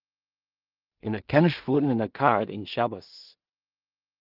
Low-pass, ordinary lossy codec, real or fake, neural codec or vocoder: 5.4 kHz; Opus, 24 kbps; fake; codec, 16 kHz in and 24 kHz out, 0.4 kbps, LongCat-Audio-Codec, two codebook decoder